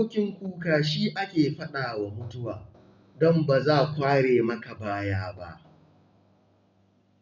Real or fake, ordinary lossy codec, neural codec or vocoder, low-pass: real; none; none; 7.2 kHz